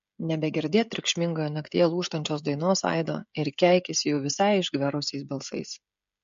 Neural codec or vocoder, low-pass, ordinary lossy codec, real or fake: codec, 16 kHz, 16 kbps, FreqCodec, smaller model; 7.2 kHz; MP3, 48 kbps; fake